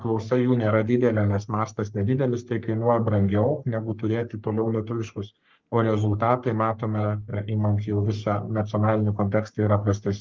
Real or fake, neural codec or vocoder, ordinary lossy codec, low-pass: fake; codec, 44.1 kHz, 3.4 kbps, Pupu-Codec; Opus, 24 kbps; 7.2 kHz